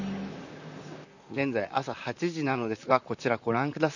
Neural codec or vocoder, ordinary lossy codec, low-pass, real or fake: vocoder, 44.1 kHz, 128 mel bands, Pupu-Vocoder; none; 7.2 kHz; fake